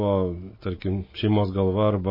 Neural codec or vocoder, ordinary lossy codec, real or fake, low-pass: none; MP3, 24 kbps; real; 5.4 kHz